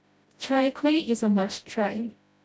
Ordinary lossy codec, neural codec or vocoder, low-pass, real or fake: none; codec, 16 kHz, 0.5 kbps, FreqCodec, smaller model; none; fake